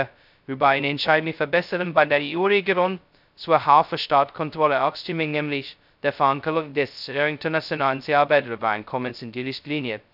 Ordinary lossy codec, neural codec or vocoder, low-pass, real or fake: none; codec, 16 kHz, 0.2 kbps, FocalCodec; 5.4 kHz; fake